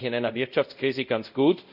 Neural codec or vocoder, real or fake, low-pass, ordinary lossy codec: codec, 24 kHz, 0.5 kbps, DualCodec; fake; 5.4 kHz; none